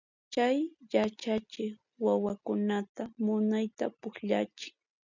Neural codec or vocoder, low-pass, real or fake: none; 7.2 kHz; real